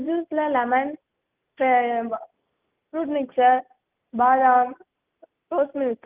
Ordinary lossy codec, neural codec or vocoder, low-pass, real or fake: Opus, 32 kbps; none; 3.6 kHz; real